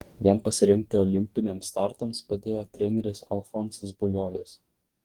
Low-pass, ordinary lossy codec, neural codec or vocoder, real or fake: 19.8 kHz; Opus, 24 kbps; codec, 44.1 kHz, 2.6 kbps, DAC; fake